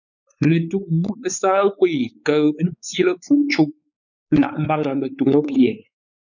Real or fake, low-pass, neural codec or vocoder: fake; 7.2 kHz; codec, 16 kHz, 4 kbps, X-Codec, WavLM features, trained on Multilingual LibriSpeech